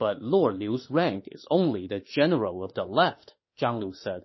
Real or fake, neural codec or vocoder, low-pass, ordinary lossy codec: fake; autoencoder, 48 kHz, 32 numbers a frame, DAC-VAE, trained on Japanese speech; 7.2 kHz; MP3, 24 kbps